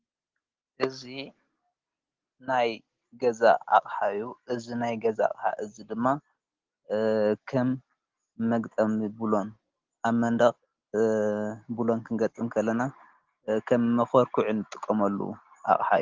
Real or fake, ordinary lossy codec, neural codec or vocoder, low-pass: real; Opus, 16 kbps; none; 7.2 kHz